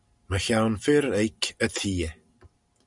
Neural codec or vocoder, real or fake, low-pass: none; real; 10.8 kHz